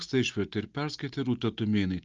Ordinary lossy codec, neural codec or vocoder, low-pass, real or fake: Opus, 24 kbps; none; 7.2 kHz; real